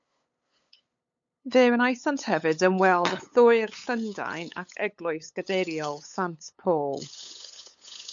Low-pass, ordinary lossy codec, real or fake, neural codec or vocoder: 7.2 kHz; MP3, 64 kbps; fake; codec, 16 kHz, 8 kbps, FunCodec, trained on LibriTTS, 25 frames a second